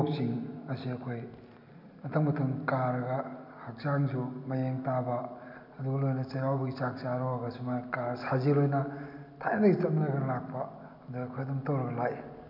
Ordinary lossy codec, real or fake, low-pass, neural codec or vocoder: none; real; 5.4 kHz; none